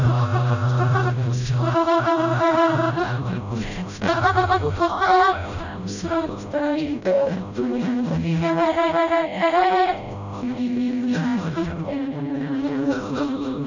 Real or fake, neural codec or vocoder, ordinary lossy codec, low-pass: fake; codec, 16 kHz, 0.5 kbps, FreqCodec, smaller model; none; 7.2 kHz